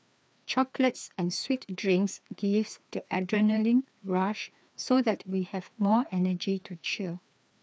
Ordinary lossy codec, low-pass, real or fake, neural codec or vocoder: none; none; fake; codec, 16 kHz, 2 kbps, FreqCodec, larger model